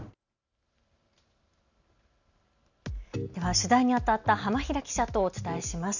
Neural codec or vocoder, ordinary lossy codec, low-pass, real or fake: none; none; 7.2 kHz; real